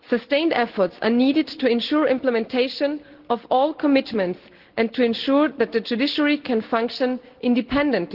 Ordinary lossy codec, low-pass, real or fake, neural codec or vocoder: Opus, 16 kbps; 5.4 kHz; real; none